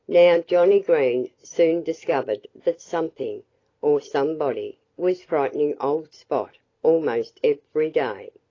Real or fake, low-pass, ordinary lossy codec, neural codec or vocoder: fake; 7.2 kHz; AAC, 32 kbps; vocoder, 44.1 kHz, 128 mel bands every 256 samples, BigVGAN v2